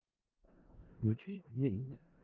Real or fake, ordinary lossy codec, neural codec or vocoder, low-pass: fake; Opus, 24 kbps; codec, 16 kHz in and 24 kHz out, 0.4 kbps, LongCat-Audio-Codec, four codebook decoder; 7.2 kHz